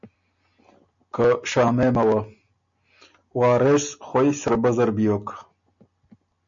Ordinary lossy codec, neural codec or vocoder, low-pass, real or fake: MP3, 64 kbps; none; 7.2 kHz; real